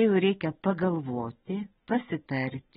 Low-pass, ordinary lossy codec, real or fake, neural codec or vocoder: 10.8 kHz; AAC, 16 kbps; real; none